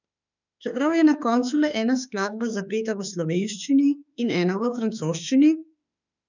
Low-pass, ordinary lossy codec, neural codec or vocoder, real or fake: 7.2 kHz; none; autoencoder, 48 kHz, 32 numbers a frame, DAC-VAE, trained on Japanese speech; fake